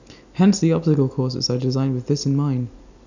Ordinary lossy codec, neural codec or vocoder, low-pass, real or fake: none; none; 7.2 kHz; real